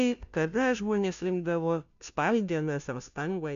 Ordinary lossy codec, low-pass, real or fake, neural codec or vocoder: MP3, 96 kbps; 7.2 kHz; fake; codec, 16 kHz, 0.5 kbps, FunCodec, trained on Chinese and English, 25 frames a second